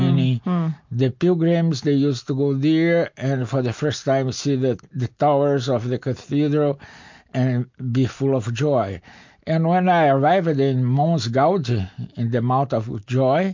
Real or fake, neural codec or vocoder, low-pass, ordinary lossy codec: real; none; 7.2 kHz; MP3, 48 kbps